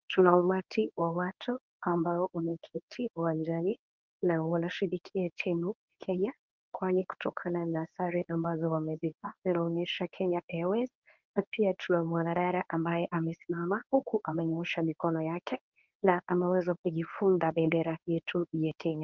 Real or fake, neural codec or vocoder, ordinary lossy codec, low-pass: fake; codec, 24 kHz, 0.9 kbps, WavTokenizer, medium speech release version 1; Opus, 32 kbps; 7.2 kHz